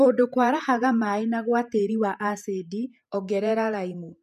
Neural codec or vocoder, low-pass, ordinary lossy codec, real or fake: vocoder, 48 kHz, 128 mel bands, Vocos; 14.4 kHz; MP3, 96 kbps; fake